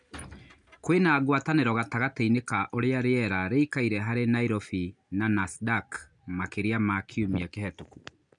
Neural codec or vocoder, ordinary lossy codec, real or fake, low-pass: none; none; real; 9.9 kHz